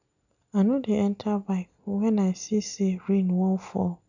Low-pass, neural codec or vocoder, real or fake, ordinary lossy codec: 7.2 kHz; none; real; none